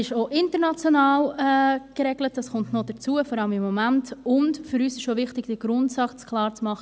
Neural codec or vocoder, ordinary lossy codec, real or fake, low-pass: none; none; real; none